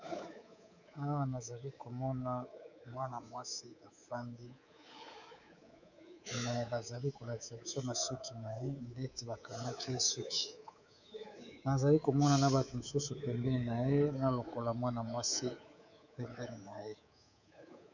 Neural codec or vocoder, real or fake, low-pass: codec, 24 kHz, 3.1 kbps, DualCodec; fake; 7.2 kHz